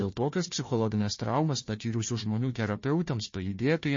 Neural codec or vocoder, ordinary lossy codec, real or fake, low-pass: codec, 16 kHz, 1 kbps, FunCodec, trained on Chinese and English, 50 frames a second; MP3, 32 kbps; fake; 7.2 kHz